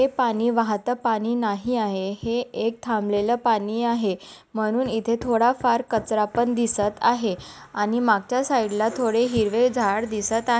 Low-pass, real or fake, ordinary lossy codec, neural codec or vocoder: none; real; none; none